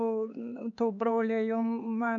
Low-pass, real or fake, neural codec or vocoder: 7.2 kHz; fake; codec, 16 kHz, 4 kbps, X-Codec, HuBERT features, trained on LibriSpeech